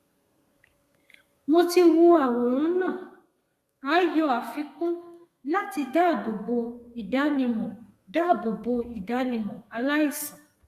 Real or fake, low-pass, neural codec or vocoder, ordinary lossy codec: fake; 14.4 kHz; codec, 32 kHz, 1.9 kbps, SNAC; Opus, 64 kbps